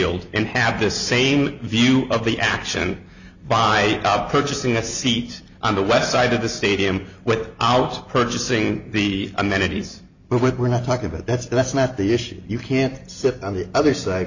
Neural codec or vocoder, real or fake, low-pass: none; real; 7.2 kHz